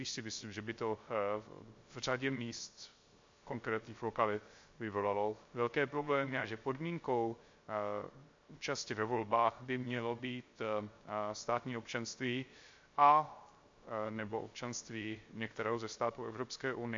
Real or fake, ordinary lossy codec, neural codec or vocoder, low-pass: fake; MP3, 48 kbps; codec, 16 kHz, 0.3 kbps, FocalCodec; 7.2 kHz